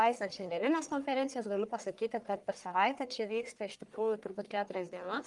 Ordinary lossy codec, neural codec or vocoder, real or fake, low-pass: Opus, 32 kbps; codec, 44.1 kHz, 1.7 kbps, Pupu-Codec; fake; 10.8 kHz